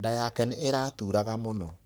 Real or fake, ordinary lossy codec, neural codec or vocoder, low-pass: fake; none; codec, 44.1 kHz, 3.4 kbps, Pupu-Codec; none